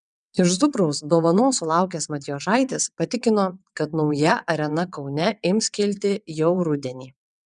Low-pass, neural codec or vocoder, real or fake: 9.9 kHz; vocoder, 22.05 kHz, 80 mel bands, WaveNeXt; fake